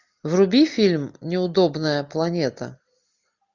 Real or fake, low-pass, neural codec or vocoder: real; 7.2 kHz; none